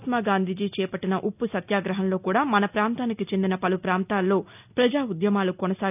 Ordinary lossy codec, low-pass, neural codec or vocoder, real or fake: none; 3.6 kHz; none; real